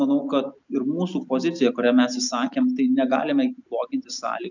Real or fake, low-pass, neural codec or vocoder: real; 7.2 kHz; none